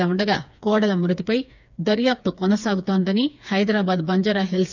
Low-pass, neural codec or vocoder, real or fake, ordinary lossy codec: 7.2 kHz; codec, 16 kHz, 4 kbps, FreqCodec, smaller model; fake; none